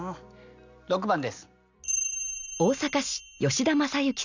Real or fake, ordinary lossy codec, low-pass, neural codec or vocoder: real; none; 7.2 kHz; none